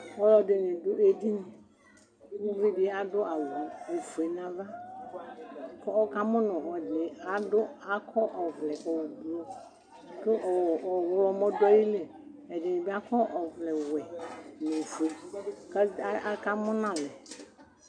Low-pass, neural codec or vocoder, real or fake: 9.9 kHz; none; real